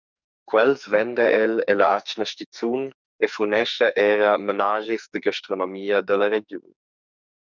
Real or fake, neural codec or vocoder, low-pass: fake; codec, 44.1 kHz, 2.6 kbps, SNAC; 7.2 kHz